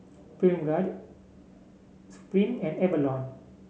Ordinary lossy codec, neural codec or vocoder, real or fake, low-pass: none; none; real; none